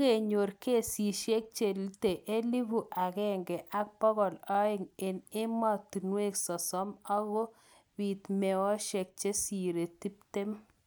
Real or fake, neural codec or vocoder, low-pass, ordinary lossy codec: real; none; none; none